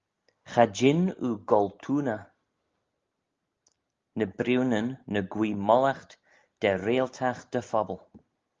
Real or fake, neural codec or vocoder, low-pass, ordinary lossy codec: real; none; 7.2 kHz; Opus, 32 kbps